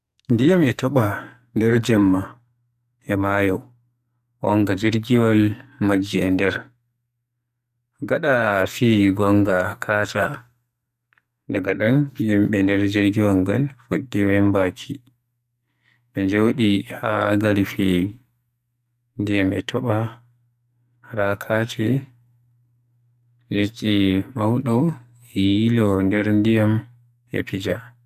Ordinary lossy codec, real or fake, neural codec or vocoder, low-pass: none; fake; codec, 44.1 kHz, 2.6 kbps, SNAC; 14.4 kHz